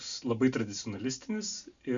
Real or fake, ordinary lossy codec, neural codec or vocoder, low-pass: real; Opus, 64 kbps; none; 7.2 kHz